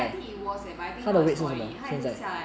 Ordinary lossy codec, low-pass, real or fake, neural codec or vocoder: none; none; real; none